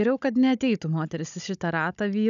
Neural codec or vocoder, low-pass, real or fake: codec, 16 kHz, 16 kbps, FunCodec, trained on Chinese and English, 50 frames a second; 7.2 kHz; fake